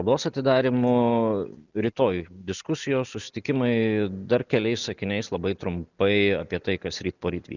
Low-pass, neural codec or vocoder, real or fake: 7.2 kHz; none; real